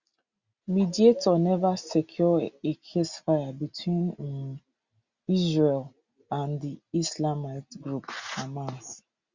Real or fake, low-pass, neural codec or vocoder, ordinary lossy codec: real; 7.2 kHz; none; Opus, 64 kbps